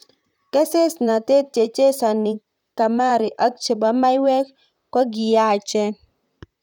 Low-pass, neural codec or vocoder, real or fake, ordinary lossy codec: 19.8 kHz; vocoder, 44.1 kHz, 128 mel bands, Pupu-Vocoder; fake; none